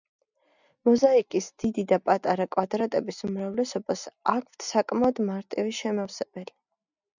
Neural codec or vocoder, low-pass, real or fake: none; 7.2 kHz; real